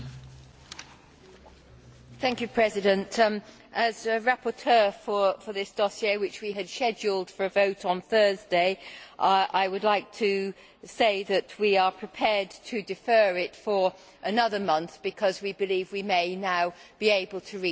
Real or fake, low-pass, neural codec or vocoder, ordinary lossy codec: real; none; none; none